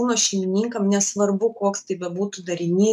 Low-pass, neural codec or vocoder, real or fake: 14.4 kHz; none; real